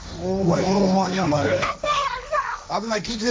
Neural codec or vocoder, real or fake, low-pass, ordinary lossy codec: codec, 16 kHz, 1.1 kbps, Voila-Tokenizer; fake; none; none